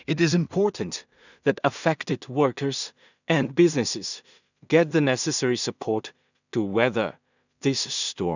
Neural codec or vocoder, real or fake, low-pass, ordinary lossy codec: codec, 16 kHz in and 24 kHz out, 0.4 kbps, LongCat-Audio-Codec, two codebook decoder; fake; 7.2 kHz; none